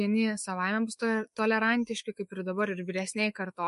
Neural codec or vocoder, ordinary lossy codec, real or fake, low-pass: autoencoder, 48 kHz, 128 numbers a frame, DAC-VAE, trained on Japanese speech; MP3, 48 kbps; fake; 14.4 kHz